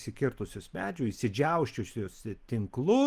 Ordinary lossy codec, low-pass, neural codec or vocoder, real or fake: Opus, 24 kbps; 14.4 kHz; none; real